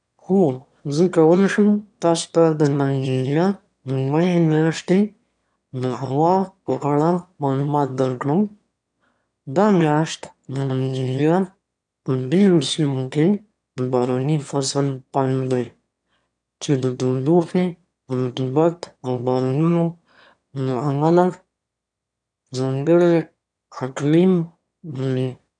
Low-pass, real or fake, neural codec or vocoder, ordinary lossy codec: 9.9 kHz; fake; autoencoder, 22.05 kHz, a latent of 192 numbers a frame, VITS, trained on one speaker; none